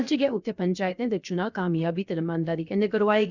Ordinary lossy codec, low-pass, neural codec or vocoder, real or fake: none; 7.2 kHz; codec, 16 kHz, 0.7 kbps, FocalCodec; fake